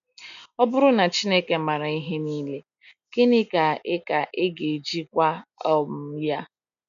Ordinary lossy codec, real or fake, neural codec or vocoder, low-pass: none; real; none; 7.2 kHz